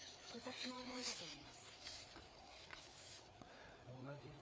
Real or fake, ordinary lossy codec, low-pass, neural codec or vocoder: fake; none; none; codec, 16 kHz, 4 kbps, FreqCodec, larger model